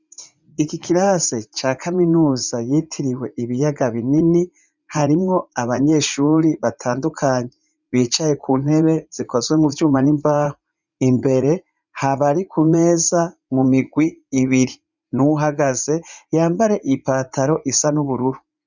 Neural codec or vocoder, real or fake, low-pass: vocoder, 44.1 kHz, 80 mel bands, Vocos; fake; 7.2 kHz